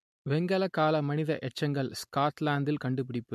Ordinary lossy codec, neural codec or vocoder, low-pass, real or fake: MP3, 64 kbps; none; 14.4 kHz; real